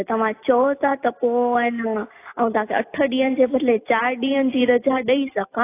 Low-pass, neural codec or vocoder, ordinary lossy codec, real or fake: 3.6 kHz; none; AAC, 24 kbps; real